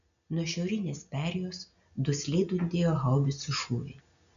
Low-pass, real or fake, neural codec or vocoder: 7.2 kHz; real; none